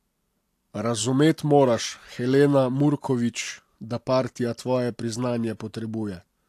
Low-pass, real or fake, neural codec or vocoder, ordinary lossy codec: 14.4 kHz; real; none; AAC, 64 kbps